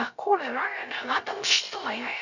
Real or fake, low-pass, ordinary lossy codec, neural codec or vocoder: fake; 7.2 kHz; none; codec, 16 kHz, 0.3 kbps, FocalCodec